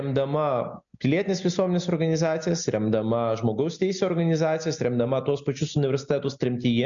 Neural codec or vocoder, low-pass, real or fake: none; 7.2 kHz; real